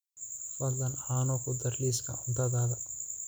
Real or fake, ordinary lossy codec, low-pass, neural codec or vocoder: real; none; none; none